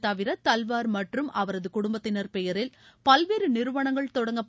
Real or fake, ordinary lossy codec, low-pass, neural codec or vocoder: real; none; none; none